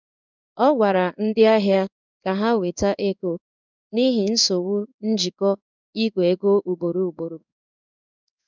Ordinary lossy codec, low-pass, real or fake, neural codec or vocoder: none; 7.2 kHz; fake; codec, 16 kHz in and 24 kHz out, 1 kbps, XY-Tokenizer